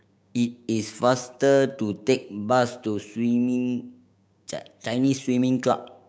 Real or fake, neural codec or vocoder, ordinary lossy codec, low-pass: fake; codec, 16 kHz, 6 kbps, DAC; none; none